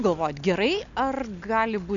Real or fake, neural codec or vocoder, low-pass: real; none; 7.2 kHz